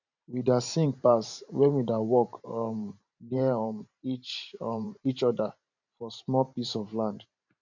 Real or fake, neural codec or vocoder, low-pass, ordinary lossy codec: fake; vocoder, 44.1 kHz, 128 mel bands every 512 samples, BigVGAN v2; 7.2 kHz; none